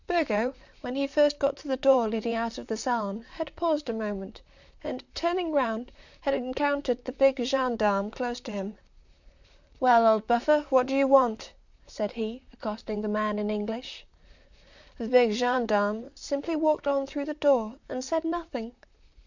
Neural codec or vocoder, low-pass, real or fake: vocoder, 44.1 kHz, 128 mel bands, Pupu-Vocoder; 7.2 kHz; fake